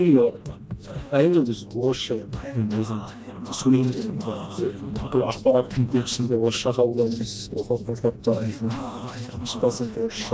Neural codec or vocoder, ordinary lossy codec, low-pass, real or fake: codec, 16 kHz, 1 kbps, FreqCodec, smaller model; none; none; fake